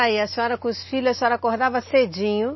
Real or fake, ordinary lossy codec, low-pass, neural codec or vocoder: real; MP3, 24 kbps; 7.2 kHz; none